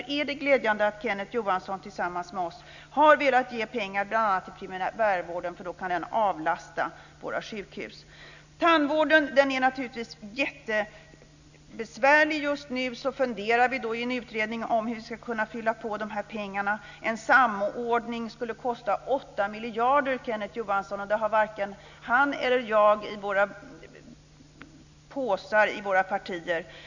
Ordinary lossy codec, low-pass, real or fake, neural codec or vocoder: none; 7.2 kHz; real; none